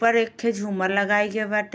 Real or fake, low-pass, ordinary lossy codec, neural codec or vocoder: real; none; none; none